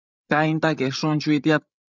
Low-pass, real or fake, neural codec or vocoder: 7.2 kHz; fake; codec, 16 kHz, 16 kbps, FreqCodec, larger model